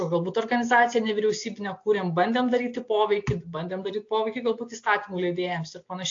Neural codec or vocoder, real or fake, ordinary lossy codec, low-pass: none; real; AAC, 48 kbps; 7.2 kHz